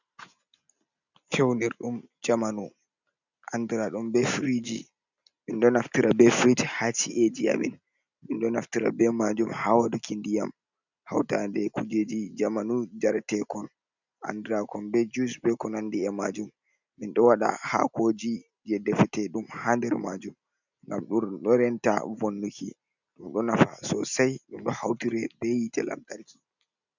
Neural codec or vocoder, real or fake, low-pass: none; real; 7.2 kHz